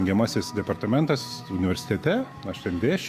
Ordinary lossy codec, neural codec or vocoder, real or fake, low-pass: Opus, 64 kbps; autoencoder, 48 kHz, 128 numbers a frame, DAC-VAE, trained on Japanese speech; fake; 14.4 kHz